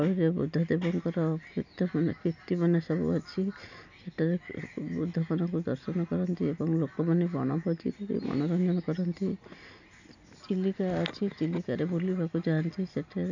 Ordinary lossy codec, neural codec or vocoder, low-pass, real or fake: none; none; 7.2 kHz; real